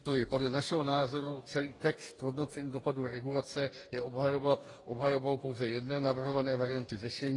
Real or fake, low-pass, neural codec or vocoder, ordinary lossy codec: fake; 10.8 kHz; codec, 44.1 kHz, 2.6 kbps, DAC; AAC, 32 kbps